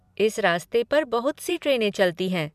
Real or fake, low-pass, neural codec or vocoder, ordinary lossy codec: fake; 14.4 kHz; vocoder, 44.1 kHz, 128 mel bands every 256 samples, BigVGAN v2; MP3, 96 kbps